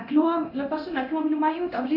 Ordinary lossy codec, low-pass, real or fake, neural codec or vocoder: AAC, 48 kbps; 5.4 kHz; fake; codec, 24 kHz, 0.9 kbps, DualCodec